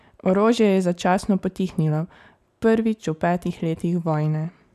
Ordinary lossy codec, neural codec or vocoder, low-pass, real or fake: none; vocoder, 44.1 kHz, 128 mel bands every 512 samples, BigVGAN v2; 14.4 kHz; fake